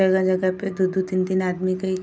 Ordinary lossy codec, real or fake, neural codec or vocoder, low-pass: none; real; none; none